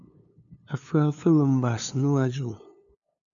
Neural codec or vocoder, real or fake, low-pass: codec, 16 kHz, 2 kbps, FunCodec, trained on LibriTTS, 25 frames a second; fake; 7.2 kHz